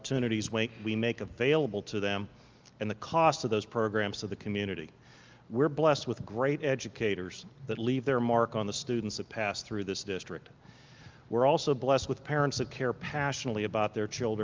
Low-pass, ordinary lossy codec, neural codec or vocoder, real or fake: 7.2 kHz; Opus, 24 kbps; none; real